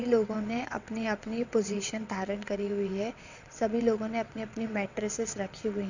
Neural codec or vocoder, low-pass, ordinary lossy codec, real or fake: vocoder, 22.05 kHz, 80 mel bands, WaveNeXt; 7.2 kHz; none; fake